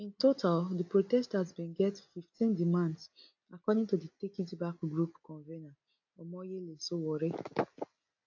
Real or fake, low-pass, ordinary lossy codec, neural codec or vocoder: real; 7.2 kHz; AAC, 48 kbps; none